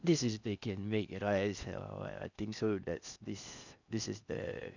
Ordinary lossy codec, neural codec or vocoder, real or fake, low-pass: none; codec, 16 kHz in and 24 kHz out, 0.6 kbps, FocalCodec, streaming, 2048 codes; fake; 7.2 kHz